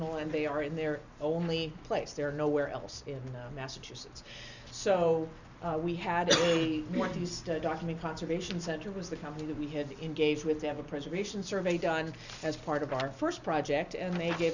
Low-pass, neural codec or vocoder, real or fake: 7.2 kHz; none; real